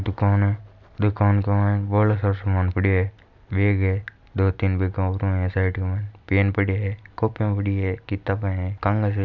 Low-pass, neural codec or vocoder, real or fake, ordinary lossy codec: 7.2 kHz; none; real; none